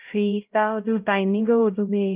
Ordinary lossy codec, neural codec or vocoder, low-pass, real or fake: Opus, 32 kbps; codec, 16 kHz, 0.5 kbps, X-Codec, HuBERT features, trained on LibriSpeech; 3.6 kHz; fake